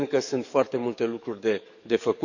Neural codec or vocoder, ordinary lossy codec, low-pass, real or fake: codec, 44.1 kHz, 7.8 kbps, DAC; none; 7.2 kHz; fake